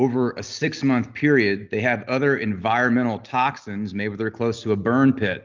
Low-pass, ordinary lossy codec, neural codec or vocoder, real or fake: 7.2 kHz; Opus, 24 kbps; none; real